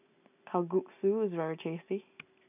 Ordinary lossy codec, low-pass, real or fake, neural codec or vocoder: none; 3.6 kHz; real; none